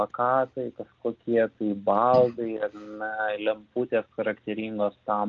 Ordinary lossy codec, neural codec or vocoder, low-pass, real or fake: Opus, 24 kbps; none; 7.2 kHz; real